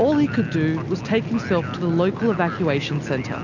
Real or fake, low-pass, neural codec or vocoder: real; 7.2 kHz; none